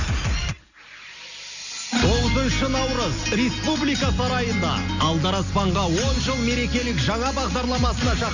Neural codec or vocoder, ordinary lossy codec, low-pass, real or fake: none; none; 7.2 kHz; real